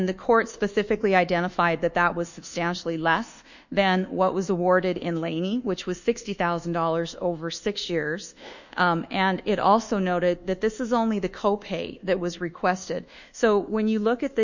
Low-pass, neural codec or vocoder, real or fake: 7.2 kHz; codec, 24 kHz, 1.2 kbps, DualCodec; fake